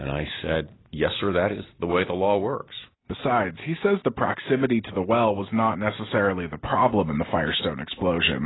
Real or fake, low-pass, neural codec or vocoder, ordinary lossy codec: real; 7.2 kHz; none; AAC, 16 kbps